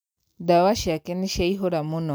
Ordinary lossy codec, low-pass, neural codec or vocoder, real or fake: none; none; none; real